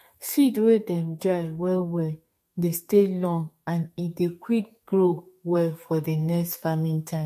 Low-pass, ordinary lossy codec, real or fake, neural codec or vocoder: 14.4 kHz; MP3, 64 kbps; fake; codec, 32 kHz, 1.9 kbps, SNAC